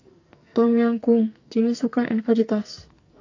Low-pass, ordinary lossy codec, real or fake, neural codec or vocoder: 7.2 kHz; AAC, 48 kbps; fake; codec, 44.1 kHz, 2.6 kbps, SNAC